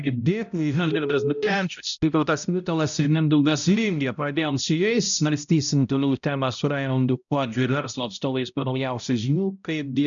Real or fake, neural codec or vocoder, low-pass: fake; codec, 16 kHz, 0.5 kbps, X-Codec, HuBERT features, trained on balanced general audio; 7.2 kHz